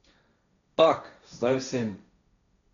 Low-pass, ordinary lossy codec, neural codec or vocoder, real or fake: 7.2 kHz; none; codec, 16 kHz, 1.1 kbps, Voila-Tokenizer; fake